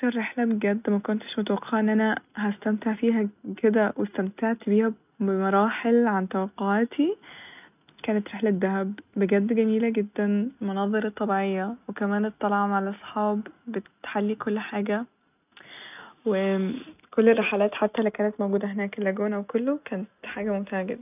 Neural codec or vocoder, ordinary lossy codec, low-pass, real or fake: none; none; 3.6 kHz; real